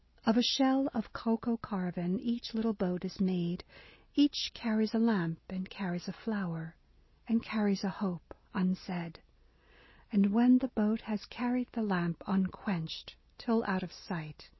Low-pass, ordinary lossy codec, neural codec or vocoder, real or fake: 7.2 kHz; MP3, 24 kbps; none; real